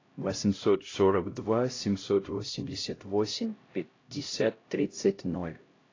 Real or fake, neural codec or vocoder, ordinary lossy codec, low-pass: fake; codec, 16 kHz, 0.5 kbps, X-Codec, HuBERT features, trained on LibriSpeech; AAC, 32 kbps; 7.2 kHz